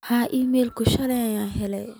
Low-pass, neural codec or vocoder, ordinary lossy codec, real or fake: none; none; none; real